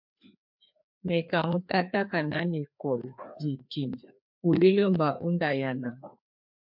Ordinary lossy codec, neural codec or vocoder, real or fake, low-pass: MP3, 48 kbps; codec, 16 kHz, 2 kbps, FreqCodec, larger model; fake; 5.4 kHz